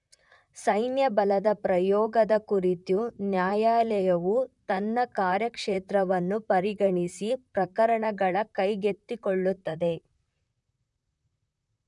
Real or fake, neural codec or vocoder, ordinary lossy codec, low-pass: fake; vocoder, 44.1 kHz, 128 mel bands, Pupu-Vocoder; none; 10.8 kHz